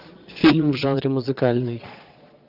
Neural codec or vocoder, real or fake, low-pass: vocoder, 22.05 kHz, 80 mel bands, Vocos; fake; 5.4 kHz